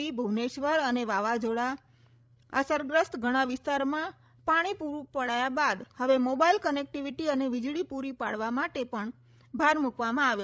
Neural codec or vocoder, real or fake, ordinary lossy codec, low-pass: codec, 16 kHz, 16 kbps, FreqCodec, larger model; fake; none; none